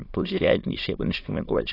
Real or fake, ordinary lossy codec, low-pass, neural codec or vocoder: fake; AAC, 24 kbps; 5.4 kHz; autoencoder, 22.05 kHz, a latent of 192 numbers a frame, VITS, trained on many speakers